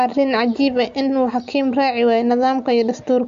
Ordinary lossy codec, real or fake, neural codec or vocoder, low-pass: AAC, 64 kbps; real; none; 7.2 kHz